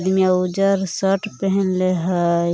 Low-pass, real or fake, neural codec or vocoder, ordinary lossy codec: none; real; none; none